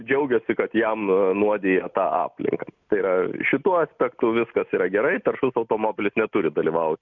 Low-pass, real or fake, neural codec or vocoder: 7.2 kHz; real; none